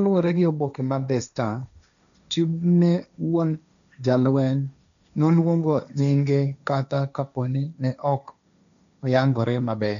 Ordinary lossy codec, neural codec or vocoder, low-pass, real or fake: none; codec, 16 kHz, 1.1 kbps, Voila-Tokenizer; 7.2 kHz; fake